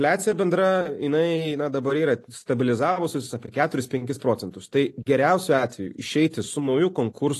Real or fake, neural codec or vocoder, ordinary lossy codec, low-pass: real; none; AAC, 48 kbps; 14.4 kHz